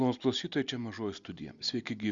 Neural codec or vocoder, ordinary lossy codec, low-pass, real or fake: none; Opus, 64 kbps; 7.2 kHz; real